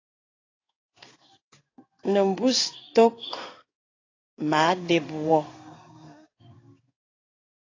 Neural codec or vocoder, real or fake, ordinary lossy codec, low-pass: codec, 16 kHz in and 24 kHz out, 1 kbps, XY-Tokenizer; fake; AAC, 32 kbps; 7.2 kHz